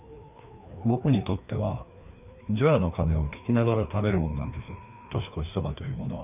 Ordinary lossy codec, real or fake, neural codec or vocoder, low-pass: none; fake; codec, 16 kHz, 2 kbps, FreqCodec, larger model; 3.6 kHz